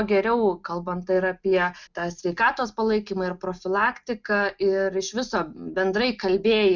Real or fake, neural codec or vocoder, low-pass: real; none; 7.2 kHz